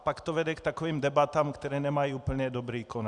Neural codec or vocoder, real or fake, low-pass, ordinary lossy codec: none; real; 10.8 kHz; Opus, 64 kbps